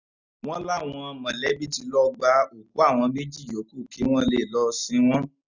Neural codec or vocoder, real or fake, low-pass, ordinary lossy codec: none; real; 7.2 kHz; none